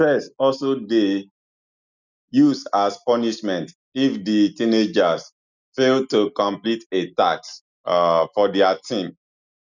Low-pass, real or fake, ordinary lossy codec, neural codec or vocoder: 7.2 kHz; real; none; none